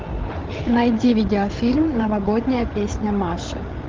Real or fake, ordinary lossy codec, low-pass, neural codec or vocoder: fake; Opus, 16 kbps; 7.2 kHz; codec, 16 kHz, 8 kbps, FreqCodec, larger model